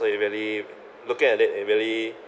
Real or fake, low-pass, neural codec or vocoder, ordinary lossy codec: real; none; none; none